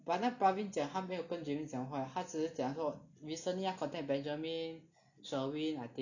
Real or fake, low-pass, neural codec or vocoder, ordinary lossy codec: real; 7.2 kHz; none; none